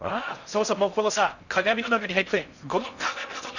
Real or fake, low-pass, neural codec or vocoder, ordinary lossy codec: fake; 7.2 kHz; codec, 16 kHz in and 24 kHz out, 0.6 kbps, FocalCodec, streaming, 4096 codes; none